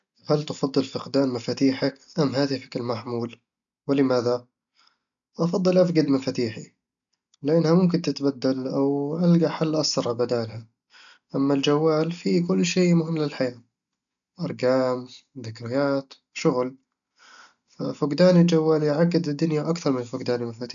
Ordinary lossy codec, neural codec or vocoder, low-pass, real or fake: none; none; 7.2 kHz; real